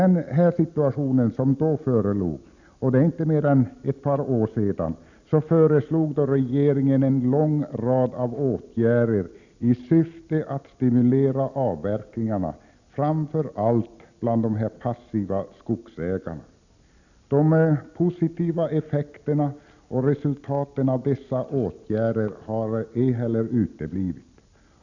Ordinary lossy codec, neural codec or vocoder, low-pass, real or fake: none; none; 7.2 kHz; real